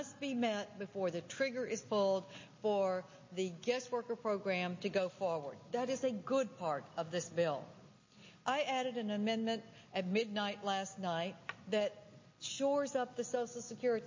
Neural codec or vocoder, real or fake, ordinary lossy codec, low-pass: none; real; MP3, 32 kbps; 7.2 kHz